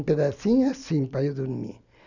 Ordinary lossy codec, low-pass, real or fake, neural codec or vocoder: none; 7.2 kHz; real; none